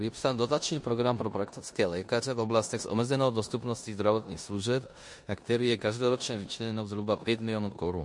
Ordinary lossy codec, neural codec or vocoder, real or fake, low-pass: MP3, 48 kbps; codec, 16 kHz in and 24 kHz out, 0.9 kbps, LongCat-Audio-Codec, four codebook decoder; fake; 10.8 kHz